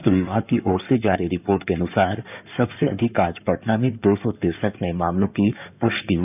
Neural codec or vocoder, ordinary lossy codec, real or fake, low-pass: codec, 16 kHz in and 24 kHz out, 2.2 kbps, FireRedTTS-2 codec; none; fake; 3.6 kHz